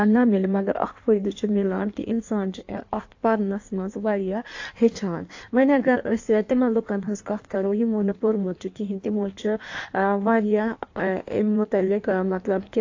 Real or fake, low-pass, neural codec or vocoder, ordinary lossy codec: fake; 7.2 kHz; codec, 16 kHz in and 24 kHz out, 1.1 kbps, FireRedTTS-2 codec; AAC, 48 kbps